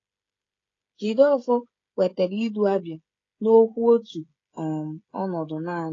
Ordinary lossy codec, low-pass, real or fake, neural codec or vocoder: AAC, 32 kbps; 7.2 kHz; fake; codec, 16 kHz, 16 kbps, FreqCodec, smaller model